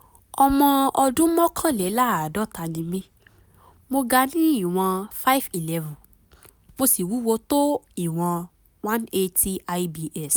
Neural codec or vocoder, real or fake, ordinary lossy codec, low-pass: none; real; none; none